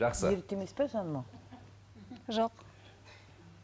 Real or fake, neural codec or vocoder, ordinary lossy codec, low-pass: real; none; none; none